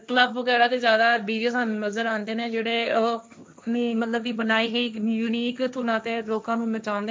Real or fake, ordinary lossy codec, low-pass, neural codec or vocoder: fake; none; none; codec, 16 kHz, 1.1 kbps, Voila-Tokenizer